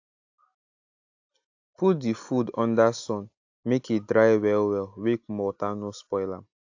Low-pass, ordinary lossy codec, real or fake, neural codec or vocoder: 7.2 kHz; AAC, 48 kbps; real; none